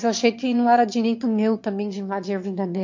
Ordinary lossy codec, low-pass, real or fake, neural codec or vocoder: MP3, 48 kbps; 7.2 kHz; fake; autoencoder, 22.05 kHz, a latent of 192 numbers a frame, VITS, trained on one speaker